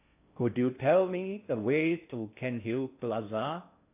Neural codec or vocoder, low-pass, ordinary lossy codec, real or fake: codec, 16 kHz in and 24 kHz out, 0.6 kbps, FocalCodec, streaming, 4096 codes; 3.6 kHz; AAC, 32 kbps; fake